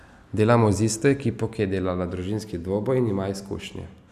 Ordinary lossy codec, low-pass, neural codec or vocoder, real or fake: none; 14.4 kHz; none; real